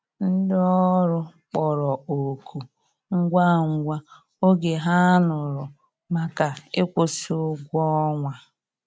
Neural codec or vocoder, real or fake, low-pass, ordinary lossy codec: none; real; none; none